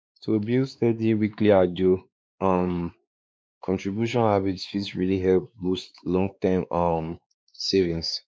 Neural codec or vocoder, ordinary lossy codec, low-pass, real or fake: codec, 16 kHz, 2 kbps, X-Codec, WavLM features, trained on Multilingual LibriSpeech; none; none; fake